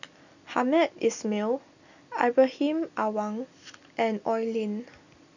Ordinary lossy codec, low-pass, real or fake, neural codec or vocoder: none; 7.2 kHz; real; none